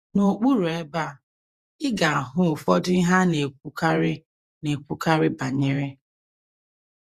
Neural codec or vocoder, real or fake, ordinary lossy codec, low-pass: vocoder, 48 kHz, 128 mel bands, Vocos; fake; Opus, 64 kbps; 14.4 kHz